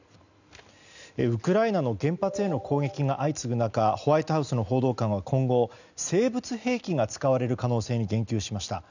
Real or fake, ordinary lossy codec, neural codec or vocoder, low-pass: real; none; none; 7.2 kHz